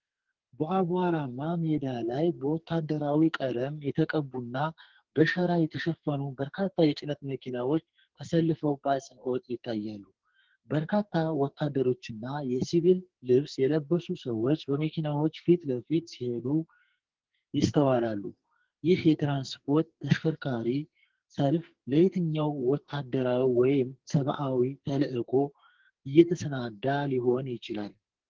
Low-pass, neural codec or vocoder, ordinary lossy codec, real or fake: 7.2 kHz; codec, 44.1 kHz, 2.6 kbps, SNAC; Opus, 16 kbps; fake